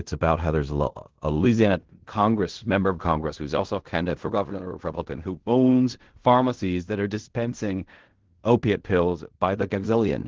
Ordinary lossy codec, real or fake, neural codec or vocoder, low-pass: Opus, 16 kbps; fake; codec, 16 kHz in and 24 kHz out, 0.4 kbps, LongCat-Audio-Codec, fine tuned four codebook decoder; 7.2 kHz